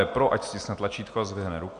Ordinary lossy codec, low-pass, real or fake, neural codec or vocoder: MP3, 64 kbps; 9.9 kHz; real; none